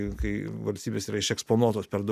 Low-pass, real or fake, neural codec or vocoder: 14.4 kHz; real; none